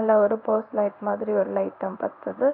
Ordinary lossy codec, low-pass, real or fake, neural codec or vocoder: none; 5.4 kHz; fake; codec, 16 kHz in and 24 kHz out, 1 kbps, XY-Tokenizer